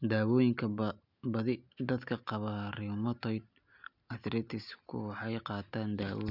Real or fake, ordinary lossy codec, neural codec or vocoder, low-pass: real; none; none; 5.4 kHz